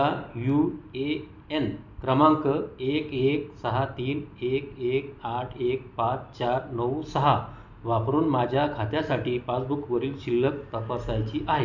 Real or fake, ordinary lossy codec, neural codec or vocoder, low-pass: real; none; none; 7.2 kHz